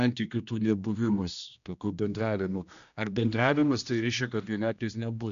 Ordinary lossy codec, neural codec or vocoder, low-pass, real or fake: MP3, 96 kbps; codec, 16 kHz, 1 kbps, X-Codec, HuBERT features, trained on general audio; 7.2 kHz; fake